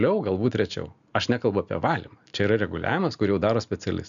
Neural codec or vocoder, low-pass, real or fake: none; 7.2 kHz; real